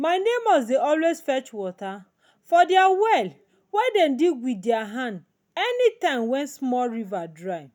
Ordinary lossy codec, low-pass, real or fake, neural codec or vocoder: none; 19.8 kHz; real; none